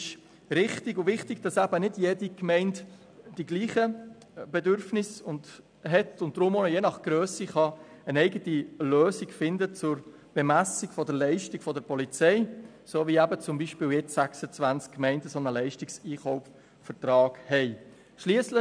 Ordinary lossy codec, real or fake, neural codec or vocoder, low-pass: none; real; none; 9.9 kHz